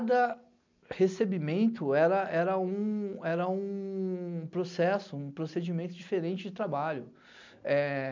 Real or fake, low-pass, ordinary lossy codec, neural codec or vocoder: real; 7.2 kHz; MP3, 64 kbps; none